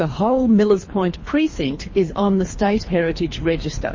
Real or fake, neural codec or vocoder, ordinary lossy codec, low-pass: fake; codec, 24 kHz, 3 kbps, HILCodec; MP3, 32 kbps; 7.2 kHz